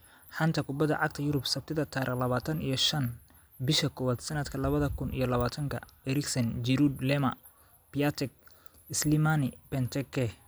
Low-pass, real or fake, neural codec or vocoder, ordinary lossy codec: none; real; none; none